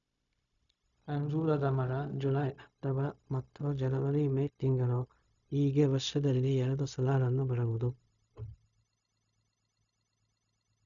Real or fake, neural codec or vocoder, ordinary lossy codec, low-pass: fake; codec, 16 kHz, 0.4 kbps, LongCat-Audio-Codec; none; 7.2 kHz